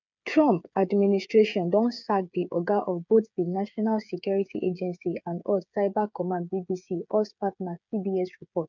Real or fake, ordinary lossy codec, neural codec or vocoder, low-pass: fake; none; codec, 16 kHz, 8 kbps, FreqCodec, smaller model; 7.2 kHz